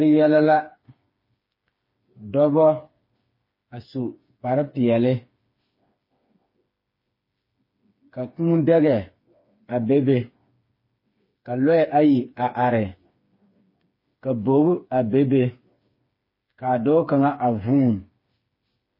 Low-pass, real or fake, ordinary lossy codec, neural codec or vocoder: 5.4 kHz; fake; MP3, 24 kbps; codec, 16 kHz, 4 kbps, FreqCodec, smaller model